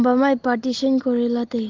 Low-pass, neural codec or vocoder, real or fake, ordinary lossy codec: 7.2 kHz; none; real; Opus, 16 kbps